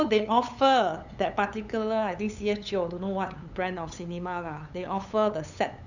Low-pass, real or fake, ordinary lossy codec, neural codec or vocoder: 7.2 kHz; fake; none; codec, 16 kHz, 8 kbps, FunCodec, trained on LibriTTS, 25 frames a second